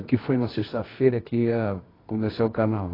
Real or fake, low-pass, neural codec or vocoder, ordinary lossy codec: fake; 5.4 kHz; codec, 24 kHz, 0.9 kbps, WavTokenizer, medium music audio release; AAC, 24 kbps